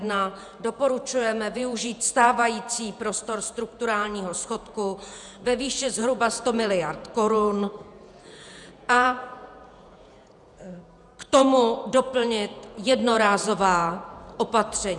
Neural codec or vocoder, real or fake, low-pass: vocoder, 48 kHz, 128 mel bands, Vocos; fake; 10.8 kHz